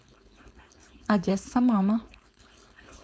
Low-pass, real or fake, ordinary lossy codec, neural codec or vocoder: none; fake; none; codec, 16 kHz, 4.8 kbps, FACodec